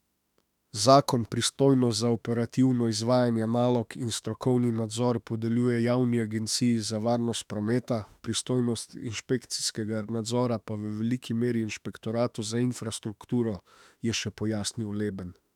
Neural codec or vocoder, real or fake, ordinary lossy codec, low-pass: autoencoder, 48 kHz, 32 numbers a frame, DAC-VAE, trained on Japanese speech; fake; none; 19.8 kHz